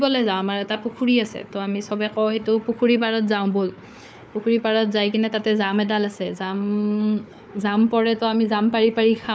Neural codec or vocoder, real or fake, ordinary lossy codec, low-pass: codec, 16 kHz, 4 kbps, FunCodec, trained on Chinese and English, 50 frames a second; fake; none; none